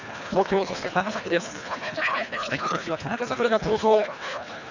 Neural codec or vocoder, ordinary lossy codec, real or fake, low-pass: codec, 24 kHz, 1.5 kbps, HILCodec; none; fake; 7.2 kHz